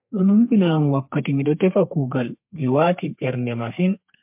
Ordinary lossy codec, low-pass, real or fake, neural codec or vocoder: MP3, 32 kbps; 3.6 kHz; fake; codec, 32 kHz, 1.9 kbps, SNAC